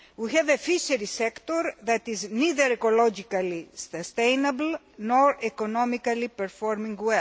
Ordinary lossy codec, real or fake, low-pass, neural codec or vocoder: none; real; none; none